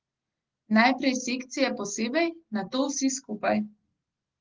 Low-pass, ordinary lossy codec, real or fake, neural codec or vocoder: 7.2 kHz; Opus, 16 kbps; real; none